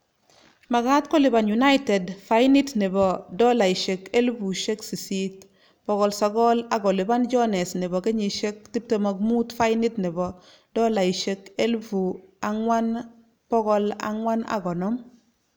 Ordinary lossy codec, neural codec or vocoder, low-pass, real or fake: none; none; none; real